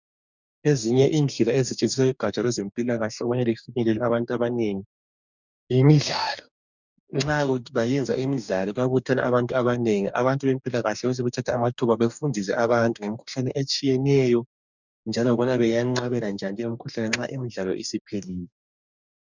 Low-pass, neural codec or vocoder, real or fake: 7.2 kHz; codec, 44.1 kHz, 2.6 kbps, DAC; fake